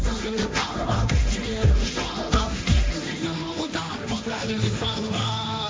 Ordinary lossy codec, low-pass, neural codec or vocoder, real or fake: none; none; codec, 16 kHz, 1.1 kbps, Voila-Tokenizer; fake